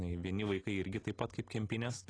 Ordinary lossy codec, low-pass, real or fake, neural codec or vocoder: AAC, 32 kbps; 9.9 kHz; real; none